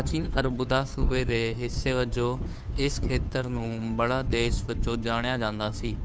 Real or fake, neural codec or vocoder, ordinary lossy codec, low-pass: fake; codec, 16 kHz, 2 kbps, FunCodec, trained on Chinese and English, 25 frames a second; none; none